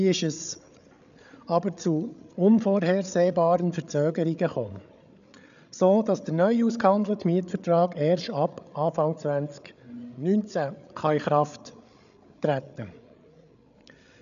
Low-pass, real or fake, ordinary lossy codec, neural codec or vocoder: 7.2 kHz; fake; none; codec, 16 kHz, 8 kbps, FreqCodec, larger model